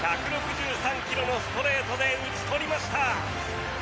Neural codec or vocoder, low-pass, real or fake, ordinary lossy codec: none; none; real; none